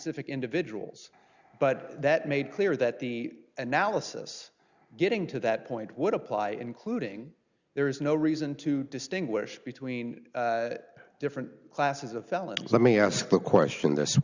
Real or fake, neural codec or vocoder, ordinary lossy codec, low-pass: real; none; Opus, 64 kbps; 7.2 kHz